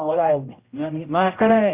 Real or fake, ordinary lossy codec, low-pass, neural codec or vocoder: fake; Opus, 64 kbps; 3.6 kHz; codec, 16 kHz, 0.5 kbps, X-Codec, HuBERT features, trained on general audio